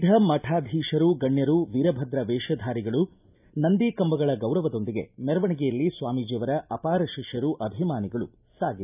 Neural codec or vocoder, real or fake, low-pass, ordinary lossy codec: none; real; 3.6 kHz; none